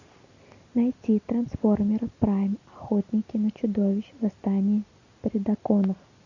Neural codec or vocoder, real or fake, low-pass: none; real; 7.2 kHz